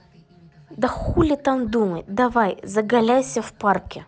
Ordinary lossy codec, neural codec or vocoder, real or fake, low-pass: none; none; real; none